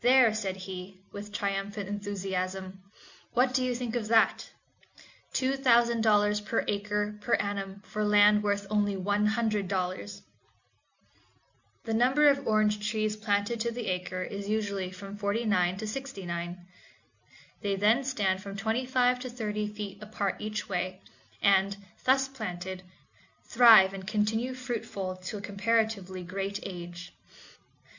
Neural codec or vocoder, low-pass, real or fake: none; 7.2 kHz; real